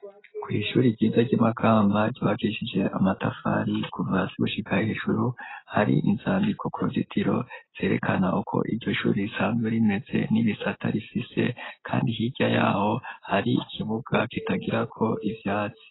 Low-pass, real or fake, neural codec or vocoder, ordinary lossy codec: 7.2 kHz; real; none; AAC, 16 kbps